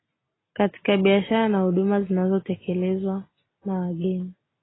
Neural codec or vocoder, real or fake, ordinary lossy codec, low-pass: none; real; AAC, 16 kbps; 7.2 kHz